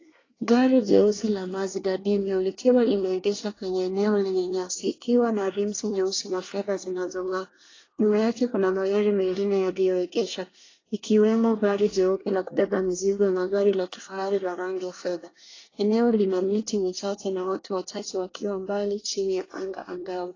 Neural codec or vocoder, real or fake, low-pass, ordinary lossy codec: codec, 24 kHz, 1 kbps, SNAC; fake; 7.2 kHz; AAC, 32 kbps